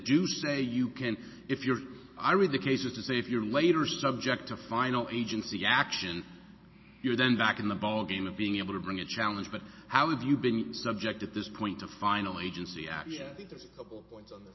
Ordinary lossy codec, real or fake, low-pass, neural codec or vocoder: MP3, 24 kbps; real; 7.2 kHz; none